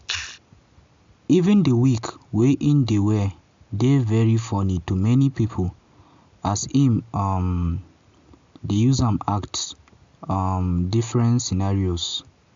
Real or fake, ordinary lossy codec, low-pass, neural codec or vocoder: real; MP3, 64 kbps; 7.2 kHz; none